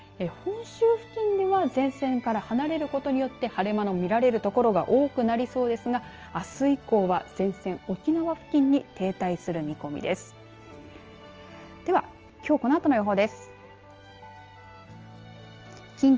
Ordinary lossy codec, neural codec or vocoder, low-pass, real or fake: Opus, 24 kbps; none; 7.2 kHz; real